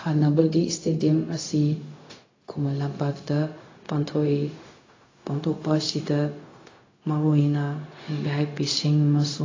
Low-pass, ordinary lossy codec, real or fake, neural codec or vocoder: 7.2 kHz; AAC, 32 kbps; fake; codec, 16 kHz, 0.4 kbps, LongCat-Audio-Codec